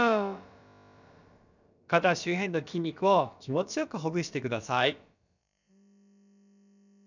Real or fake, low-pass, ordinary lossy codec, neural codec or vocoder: fake; 7.2 kHz; none; codec, 16 kHz, about 1 kbps, DyCAST, with the encoder's durations